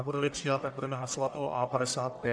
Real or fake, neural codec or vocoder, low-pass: fake; codec, 44.1 kHz, 1.7 kbps, Pupu-Codec; 9.9 kHz